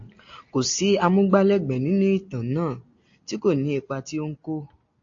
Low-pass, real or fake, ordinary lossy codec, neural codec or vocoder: 7.2 kHz; real; AAC, 48 kbps; none